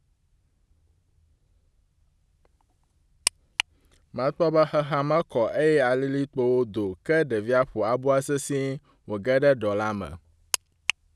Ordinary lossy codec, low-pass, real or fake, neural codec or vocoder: none; none; real; none